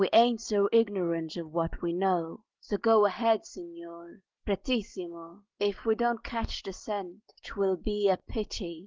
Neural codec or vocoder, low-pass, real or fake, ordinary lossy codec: none; 7.2 kHz; real; Opus, 16 kbps